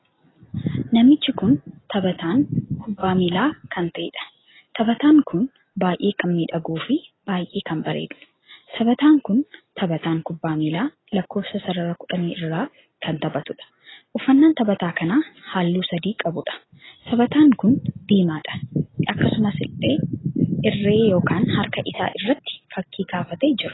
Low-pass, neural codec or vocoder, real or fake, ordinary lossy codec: 7.2 kHz; none; real; AAC, 16 kbps